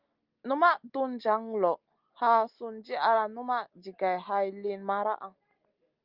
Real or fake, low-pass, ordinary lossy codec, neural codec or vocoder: real; 5.4 kHz; Opus, 32 kbps; none